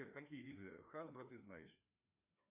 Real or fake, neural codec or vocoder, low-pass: fake; codec, 16 kHz, 2 kbps, FreqCodec, larger model; 3.6 kHz